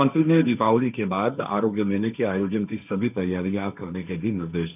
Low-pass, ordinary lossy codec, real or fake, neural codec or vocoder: 3.6 kHz; none; fake; codec, 16 kHz, 1.1 kbps, Voila-Tokenizer